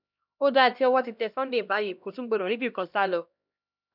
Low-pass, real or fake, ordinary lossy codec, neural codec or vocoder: 5.4 kHz; fake; none; codec, 16 kHz, 1 kbps, X-Codec, HuBERT features, trained on LibriSpeech